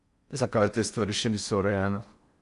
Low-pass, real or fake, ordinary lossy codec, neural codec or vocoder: 10.8 kHz; fake; AAC, 64 kbps; codec, 16 kHz in and 24 kHz out, 0.6 kbps, FocalCodec, streaming, 4096 codes